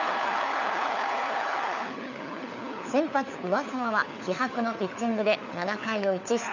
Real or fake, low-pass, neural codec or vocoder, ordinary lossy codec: fake; 7.2 kHz; codec, 16 kHz, 4 kbps, FunCodec, trained on LibriTTS, 50 frames a second; none